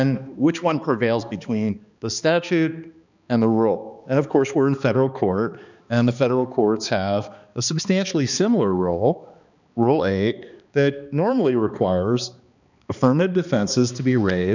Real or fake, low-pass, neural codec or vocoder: fake; 7.2 kHz; codec, 16 kHz, 2 kbps, X-Codec, HuBERT features, trained on balanced general audio